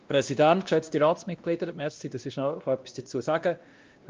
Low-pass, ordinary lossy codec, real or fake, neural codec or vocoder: 7.2 kHz; Opus, 24 kbps; fake; codec, 16 kHz, 1 kbps, X-Codec, WavLM features, trained on Multilingual LibriSpeech